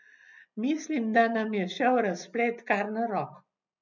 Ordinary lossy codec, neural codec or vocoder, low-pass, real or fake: none; none; 7.2 kHz; real